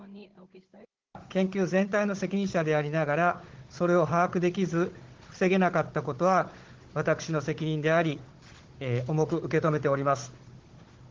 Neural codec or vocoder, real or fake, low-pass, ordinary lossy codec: codec, 16 kHz, 16 kbps, FunCodec, trained on Chinese and English, 50 frames a second; fake; 7.2 kHz; Opus, 16 kbps